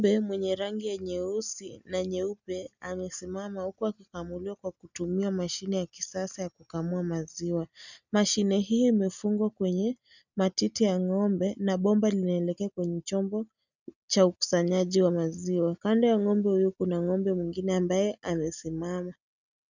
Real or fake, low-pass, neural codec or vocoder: real; 7.2 kHz; none